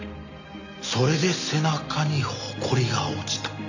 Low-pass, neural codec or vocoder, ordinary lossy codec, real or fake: 7.2 kHz; none; none; real